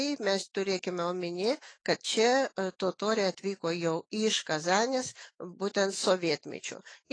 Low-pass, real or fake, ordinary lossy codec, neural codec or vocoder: 9.9 kHz; fake; AAC, 32 kbps; autoencoder, 48 kHz, 128 numbers a frame, DAC-VAE, trained on Japanese speech